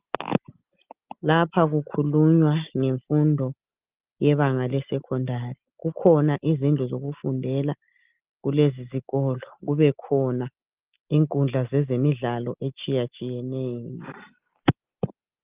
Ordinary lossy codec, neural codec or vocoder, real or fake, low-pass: Opus, 32 kbps; none; real; 3.6 kHz